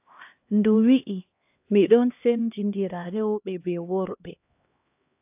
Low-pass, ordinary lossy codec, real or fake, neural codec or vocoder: 3.6 kHz; AAC, 32 kbps; fake; codec, 16 kHz, 1 kbps, X-Codec, HuBERT features, trained on LibriSpeech